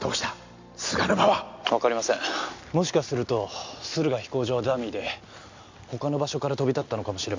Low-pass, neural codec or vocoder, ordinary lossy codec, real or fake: 7.2 kHz; none; MP3, 64 kbps; real